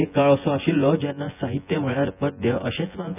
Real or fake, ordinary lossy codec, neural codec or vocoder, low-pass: fake; none; vocoder, 24 kHz, 100 mel bands, Vocos; 3.6 kHz